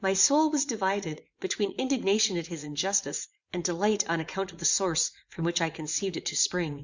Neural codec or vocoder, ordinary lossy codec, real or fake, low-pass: vocoder, 44.1 kHz, 80 mel bands, Vocos; Opus, 64 kbps; fake; 7.2 kHz